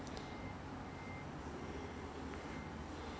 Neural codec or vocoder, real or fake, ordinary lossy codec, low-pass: none; real; none; none